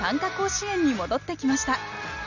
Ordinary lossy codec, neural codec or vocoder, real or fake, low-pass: none; none; real; 7.2 kHz